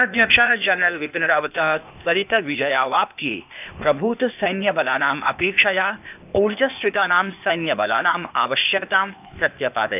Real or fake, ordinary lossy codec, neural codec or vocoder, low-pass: fake; none; codec, 16 kHz, 0.8 kbps, ZipCodec; 3.6 kHz